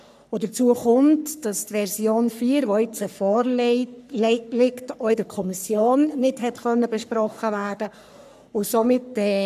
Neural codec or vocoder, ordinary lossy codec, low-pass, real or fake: codec, 44.1 kHz, 3.4 kbps, Pupu-Codec; none; 14.4 kHz; fake